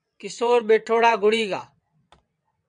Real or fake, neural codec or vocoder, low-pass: fake; vocoder, 22.05 kHz, 80 mel bands, WaveNeXt; 9.9 kHz